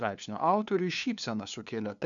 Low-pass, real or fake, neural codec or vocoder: 7.2 kHz; fake; codec, 16 kHz, 2 kbps, FunCodec, trained on LibriTTS, 25 frames a second